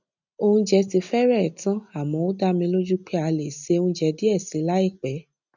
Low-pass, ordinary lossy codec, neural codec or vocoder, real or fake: 7.2 kHz; none; none; real